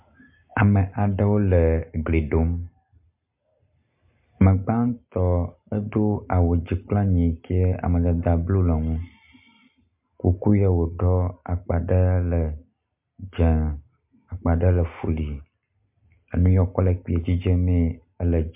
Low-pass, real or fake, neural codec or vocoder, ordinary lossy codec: 3.6 kHz; real; none; MP3, 32 kbps